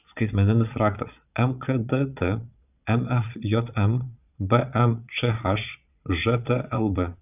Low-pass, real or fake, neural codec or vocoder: 3.6 kHz; fake; vocoder, 22.05 kHz, 80 mel bands, Vocos